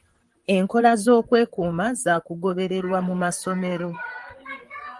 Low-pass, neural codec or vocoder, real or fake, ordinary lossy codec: 10.8 kHz; vocoder, 44.1 kHz, 128 mel bands, Pupu-Vocoder; fake; Opus, 24 kbps